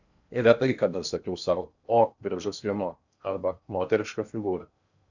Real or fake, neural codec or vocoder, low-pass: fake; codec, 16 kHz in and 24 kHz out, 0.8 kbps, FocalCodec, streaming, 65536 codes; 7.2 kHz